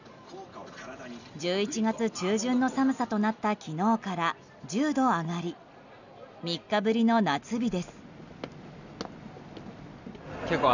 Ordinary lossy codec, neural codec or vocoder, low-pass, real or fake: none; none; 7.2 kHz; real